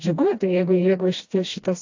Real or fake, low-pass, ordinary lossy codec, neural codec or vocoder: fake; 7.2 kHz; AAC, 48 kbps; codec, 16 kHz, 1 kbps, FreqCodec, smaller model